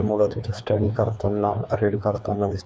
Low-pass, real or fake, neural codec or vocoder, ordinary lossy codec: none; fake; codec, 16 kHz, 2 kbps, FreqCodec, larger model; none